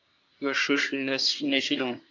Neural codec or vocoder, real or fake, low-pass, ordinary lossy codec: codec, 24 kHz, 1 kbps, SNAC; fake; 7.2 kHz; AAC, 48 kbps